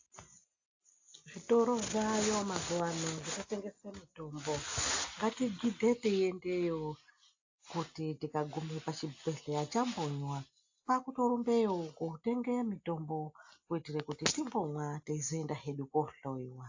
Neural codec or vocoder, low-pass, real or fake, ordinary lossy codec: none; 7.2 kHz; real; AAC, 48 kbps